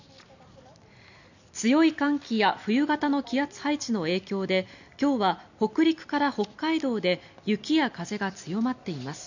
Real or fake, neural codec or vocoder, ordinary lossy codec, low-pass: real; none; none; 7.2 kHz